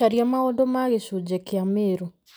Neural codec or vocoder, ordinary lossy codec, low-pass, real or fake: none; none; none; real